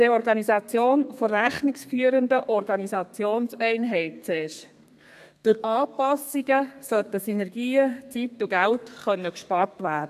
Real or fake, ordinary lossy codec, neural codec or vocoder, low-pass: fake; AAC, 96 kbps; codec, 32 kHz, 1.9 kbps, SNAC; 14.4 kHz